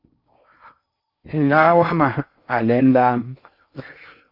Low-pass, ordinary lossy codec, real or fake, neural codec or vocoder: 5.4 kHz; Opus, 64 kbps; fake; codec, 16 kHz in and 24 kHz out, 0.6 kbps, FocalCodec, streaming, 4096 codes